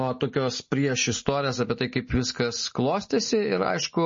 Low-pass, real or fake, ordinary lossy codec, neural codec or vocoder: 7.2 kHz; real; MP3, 32 kbps; none